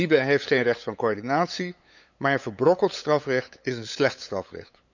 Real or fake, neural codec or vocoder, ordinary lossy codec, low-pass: fake; codec, 16 kHz, 8 kbps, FunCodec, trained on LibriTTS, 25 frames a second; none; 7.2 kHz